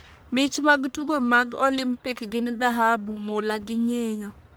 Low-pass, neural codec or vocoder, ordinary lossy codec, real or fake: none; codec, 44.1 kHz, 1.7 kbps, Pupu-Codec; none; fake